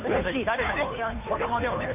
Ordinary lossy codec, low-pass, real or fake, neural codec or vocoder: none; 3.6 kHz; fake; codec, 16 kHz, 2 kbps, FunCodec, trained on Chinese and English, 25 frames a second